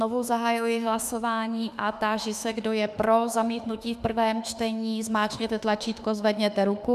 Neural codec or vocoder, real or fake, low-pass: autoencoder, 48 kHz, 32 numbers a frame, DAC-VAE, trained on Japanese speech; fake; 14.4 kHz